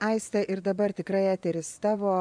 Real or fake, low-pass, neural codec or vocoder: fake; 9.9 kHz; vocoder, 24 kHz, 100 mel bands, Vocos